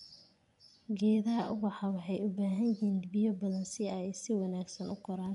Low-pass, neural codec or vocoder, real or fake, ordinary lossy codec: 10.8 kHz; vocoder, 24 kHz, 100 mel bands, Vocos; fake; MP3, 96 kbps